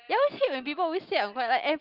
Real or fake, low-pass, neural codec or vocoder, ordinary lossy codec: real; 5.4 kHz; none; Opus, 32 kbps